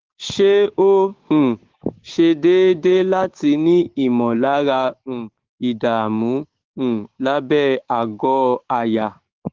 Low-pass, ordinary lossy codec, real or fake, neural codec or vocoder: 7.2 kHz; Opus, 16 kbps; fake; codec, 16 kHz, 6 kbps, DAC